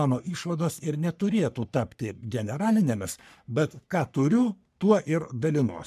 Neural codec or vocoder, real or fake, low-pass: codec, 44.1 kHz, 3.4 kbps, Pupu-Codec; fake; 14.4 kHz